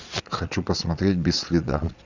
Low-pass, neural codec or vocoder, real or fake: 7.2 kHz; vocoder, 22.05 kHz, 80 mel bands, Vocos; fake